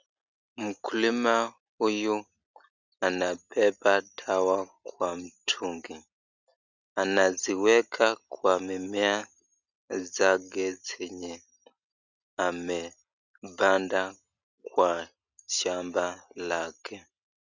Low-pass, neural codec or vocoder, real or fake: 7.2 kHz; none; real